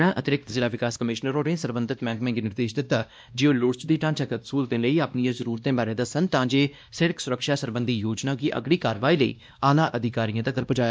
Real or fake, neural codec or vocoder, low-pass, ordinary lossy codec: fake; codec, 16 kHz, 1 kbps, X-Codec, WavLM features, trained on Multilingual LibriSpeech; none; none